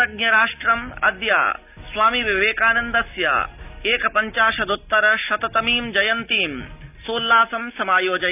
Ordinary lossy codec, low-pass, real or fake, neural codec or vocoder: none; 3.6 kHz; real; none